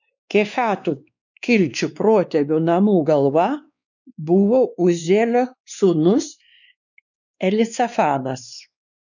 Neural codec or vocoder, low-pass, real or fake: codec, 16 kHz, 2 kbps, X-Codec, WavLM features, trained on Multilingual LibriSpeech; 7.2 kHz; fake